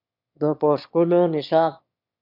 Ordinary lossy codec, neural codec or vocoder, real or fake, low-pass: MP3, 48 kbps; autoencoder, 22.05 kHz, a latent of 192 numbers a frame, VITS, trained on one speaker; fake; 5.4 kHz